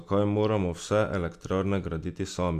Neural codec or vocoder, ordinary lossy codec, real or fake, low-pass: none; Opus, 64 kbps; real; 14.4 kHz